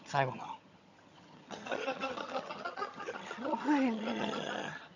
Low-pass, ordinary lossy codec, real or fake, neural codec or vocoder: 7.2 kHz; none; fake; vocoder, 22.05 kHz, 80 mel bands, HiFi-GAN